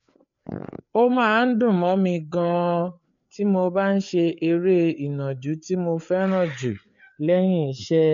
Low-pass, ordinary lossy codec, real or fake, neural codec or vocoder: 7.2 kHz; MP3, 64 kbps; fake; codec, 16 kHz, 4 kbps, FreqCodec, larger model